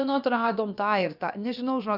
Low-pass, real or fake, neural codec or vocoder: 5.4 kHz; fake; codec, 16 kHz, about 1 kbps, DyCAST, with the encoder's durations